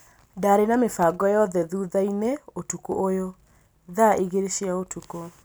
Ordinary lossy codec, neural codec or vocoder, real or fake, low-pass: none; none; real; none